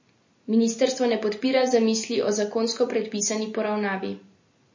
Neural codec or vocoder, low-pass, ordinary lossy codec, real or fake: none; 7.2 kHz; MP3, 32 kbps; real